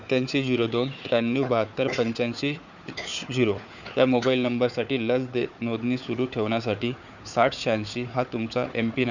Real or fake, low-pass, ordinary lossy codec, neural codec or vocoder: fake; 7.2 kHz; none; codec, 16 kHz, 4 kbps, FunCodec, trained on Chinese and English, 50 frames a second